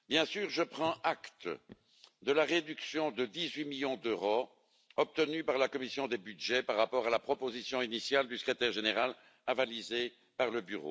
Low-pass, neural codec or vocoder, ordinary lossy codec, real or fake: none; none; none; real